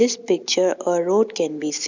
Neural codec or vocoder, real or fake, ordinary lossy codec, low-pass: none; real; none; 7.2 kHz